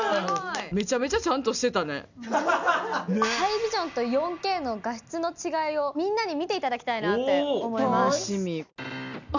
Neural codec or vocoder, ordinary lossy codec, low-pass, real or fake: none; none; 7.2 kHz; real